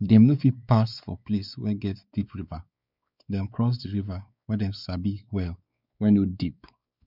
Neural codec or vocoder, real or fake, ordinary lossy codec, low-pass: codec, 16 kHz, 4 kbps, X-Codec, WavLM features, trained on Multilingual LibriSpeech; fake; none; 5.4 kHz